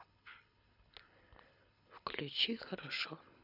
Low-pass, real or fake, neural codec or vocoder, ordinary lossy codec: 5.4 kHz; fake; codec, 24 kHz, 6 kbps, HILCodec; none